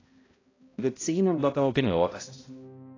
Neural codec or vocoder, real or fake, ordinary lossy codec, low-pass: codec, 16 kHz, 0.5 kbps, X-Codec, HuBERT features, trained on balanced general audio; fake; AAC, 32 kbps; 7.2 kHz